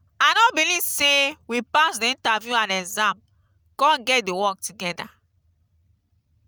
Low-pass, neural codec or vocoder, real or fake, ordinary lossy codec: none; none; real; none